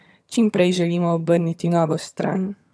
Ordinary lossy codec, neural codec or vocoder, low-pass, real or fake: none; vocoder, 22.05 kHz, 80 mel bands, HiFi-GAN; none; fake